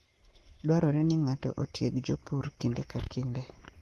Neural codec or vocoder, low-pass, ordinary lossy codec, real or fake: codec, 44.1 kHz, 7.8 kbps, Pupu-Codec; 14.4 kHz; Opus, 16 kbps; fake